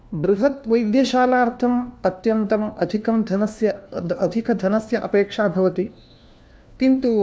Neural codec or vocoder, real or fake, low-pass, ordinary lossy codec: codec, 16 kHz, 1 kbps, FunCodec, trained on LibriTTS, 50 frames a second; fake; none; none